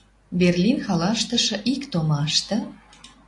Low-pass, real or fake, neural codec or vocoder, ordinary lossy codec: 10.8 kHz; real; none; AAC, 48 kbps